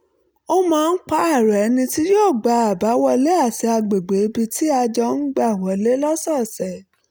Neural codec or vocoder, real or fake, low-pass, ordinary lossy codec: none; real; none; none